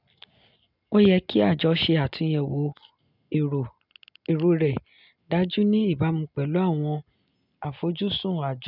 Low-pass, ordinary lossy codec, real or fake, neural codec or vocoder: 5.4 kHz; none; real; none